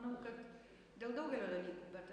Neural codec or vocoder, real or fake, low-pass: none; real; 9.9 kHz